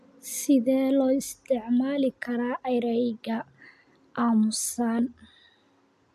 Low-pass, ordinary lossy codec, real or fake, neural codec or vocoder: 14.4 kHz; AAC, 96 kbps; real; none